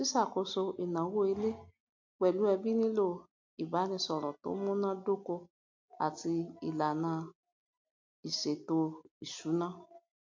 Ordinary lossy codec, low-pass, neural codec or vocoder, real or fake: MP3, 48 kbps; 7.2 kHz; none; real